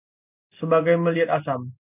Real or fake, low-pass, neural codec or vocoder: real; 3.6 kHz; none